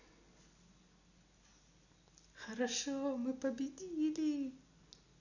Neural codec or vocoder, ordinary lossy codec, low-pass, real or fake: none; none; 7.2 kHz; real